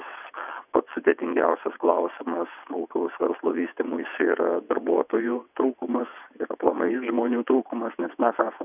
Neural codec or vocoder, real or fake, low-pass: vocoder, 22.05 kHz, 80 mel bands, WaveNeXt; fake; 3.6 kHz